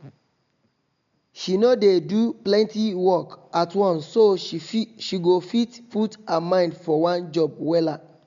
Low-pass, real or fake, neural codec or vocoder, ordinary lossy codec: 7.2 kHz; real; none; MP3, 64 kbps